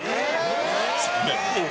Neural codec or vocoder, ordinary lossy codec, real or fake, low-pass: none; none; real; none